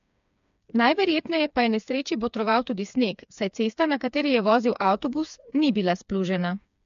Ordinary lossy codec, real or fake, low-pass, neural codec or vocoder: MP3, 64 kbps; fake; 7.2 kHz; codec, 16 kHz, 4 kbps, FreqCodec, smaller model